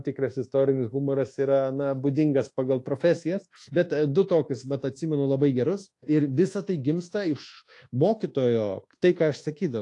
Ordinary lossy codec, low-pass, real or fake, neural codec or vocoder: AAC, 48 kbps; 10.8 kHz; fake; codec, 24 kHz, 1.2 kbps, DualCodec